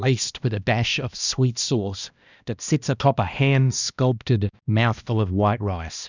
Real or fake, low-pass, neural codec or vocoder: fake; 7.2 kHz; codec, 16 kHz, 1 kbps, X-Codec, HuBERT features, trained on balanced general audio